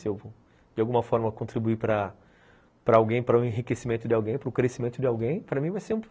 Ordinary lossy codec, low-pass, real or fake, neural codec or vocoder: none; none; real; none